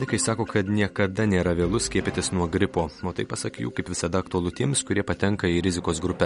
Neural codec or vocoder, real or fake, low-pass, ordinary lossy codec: none; real; 10.8 kHz; MP3, 48 kbps